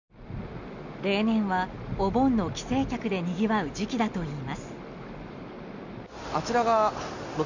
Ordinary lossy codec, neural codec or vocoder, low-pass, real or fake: none; none; 7.2 kHz; real